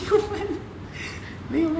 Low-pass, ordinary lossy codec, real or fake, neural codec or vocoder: none; none; real; none